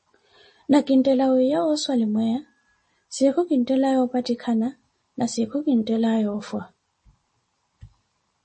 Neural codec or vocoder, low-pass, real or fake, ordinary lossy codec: none; 10.8 kHz; real; MP3, 32 kbps